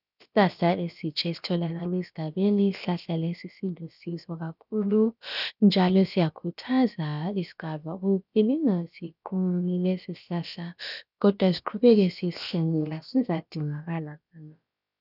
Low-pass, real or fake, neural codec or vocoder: 5.4 kHz; fake; codec, 16 kHz, about 1 kbps, DyCAST, with the encoder's durations